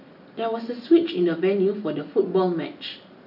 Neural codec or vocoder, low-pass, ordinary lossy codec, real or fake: none; 5.4 kHz; none; real